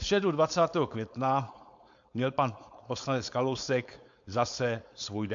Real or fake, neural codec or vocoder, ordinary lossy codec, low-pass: fake; codec, 16 kHz, 4.8 kbps, FACodec; AAC, 64 kbps; 7.2 kHz